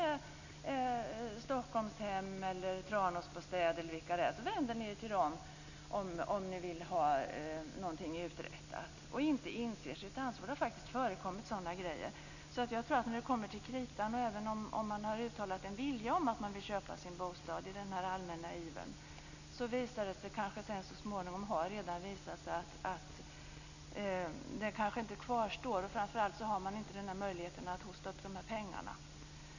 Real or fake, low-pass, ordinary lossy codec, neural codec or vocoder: real; 7.2 kHz; none; none